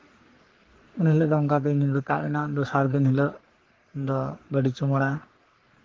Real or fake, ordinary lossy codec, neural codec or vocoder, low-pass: fake; Opus, 16 kbps; codec, 44.1 kHz, 3.4 kbps, Pupu-Codec; 7.2 kHz